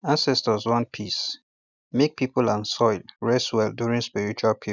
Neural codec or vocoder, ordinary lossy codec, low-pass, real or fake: none; none; 7.2 kHz; real